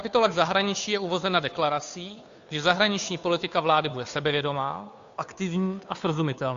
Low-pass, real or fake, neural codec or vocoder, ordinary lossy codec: 7.2 kHz; fake; codec, 16 kHz, 2 kbps, FunCodec, trained on Chinese and English, 25 frames a second; AAC, 64 kbps